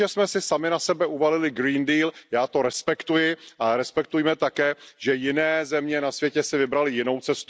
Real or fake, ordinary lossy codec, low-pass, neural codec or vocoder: real; none; none; none